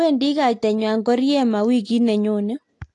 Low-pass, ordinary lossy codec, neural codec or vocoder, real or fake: 10.8 kHz; AAC, 48 kbps; none; real